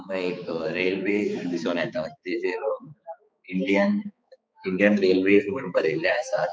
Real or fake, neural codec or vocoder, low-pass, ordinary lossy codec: fake; codec, 16 kHz, 4 kbps, X-Codec, HuBERT features, trained on balanced general audio; none; none